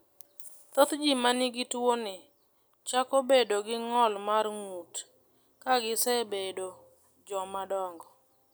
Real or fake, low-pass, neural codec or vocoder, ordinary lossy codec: real; none; none; none